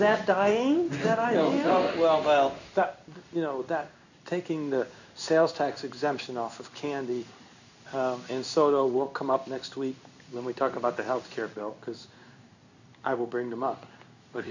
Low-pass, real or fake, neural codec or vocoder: 7.2 kHz; fake; codec, 16 kHz in and 24 kHz out, 1 kbps, XY-Tokenizer